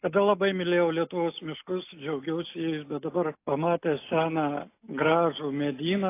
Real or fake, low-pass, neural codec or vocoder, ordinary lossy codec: real; 3.6 kHz; none; AAC, 24 kbps